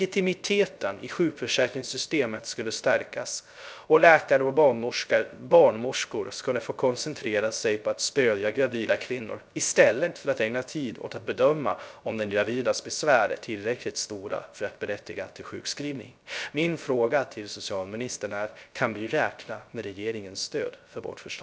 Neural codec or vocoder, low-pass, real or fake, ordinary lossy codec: codec, 16 kHz, 0.3 kbps, FocalCodec; none; fake; none